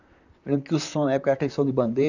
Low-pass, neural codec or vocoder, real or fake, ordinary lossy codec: 7.2 kHz; vocoder, 44.1 kHz, 128 mel bands, Pupu-Vocoder; fake; none